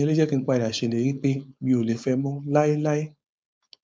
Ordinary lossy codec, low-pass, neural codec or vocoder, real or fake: none; none; codec, 16 kHz, 4.8 kbps, FACodec; fake